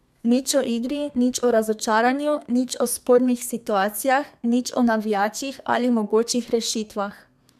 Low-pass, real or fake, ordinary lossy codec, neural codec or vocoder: 14.4 kHz; fake; none; codec, 32 kHz, 1.9 kbps, SNAC